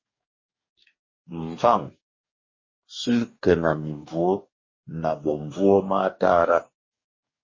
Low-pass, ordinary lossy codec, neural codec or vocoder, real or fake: 7.2 kHz; MP3, 32 kbps; codec, 44.1 kHz, 2.6 kbps, DAC; fake